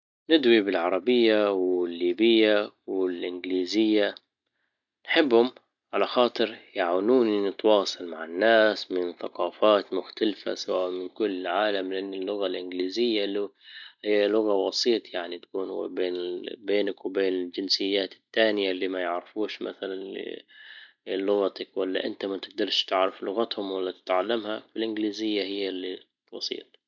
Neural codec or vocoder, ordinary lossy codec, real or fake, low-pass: none; none; real; 7.2 kHz